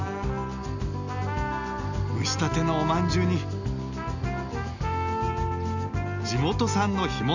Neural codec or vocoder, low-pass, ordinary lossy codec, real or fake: none; 7.2 kHz; none; real